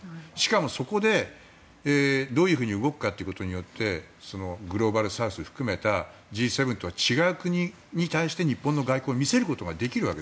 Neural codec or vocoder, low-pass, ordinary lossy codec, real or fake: none; none; none; real